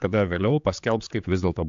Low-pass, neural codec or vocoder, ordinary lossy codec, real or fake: 7.2 kHz; codec, 16 kHz, 4 kbps, X-Codec, HuBERT features, trained on general audio; AAC, 64 kbps; fake